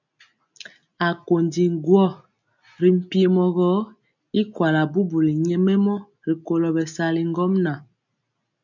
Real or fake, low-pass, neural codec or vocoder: real; 7.2 kHz; none